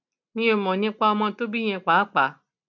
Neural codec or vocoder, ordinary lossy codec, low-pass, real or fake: none; none; 7.2 kHz; real